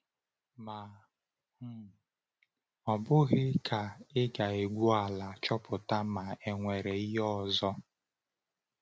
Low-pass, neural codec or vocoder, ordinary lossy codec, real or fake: none; none; none; real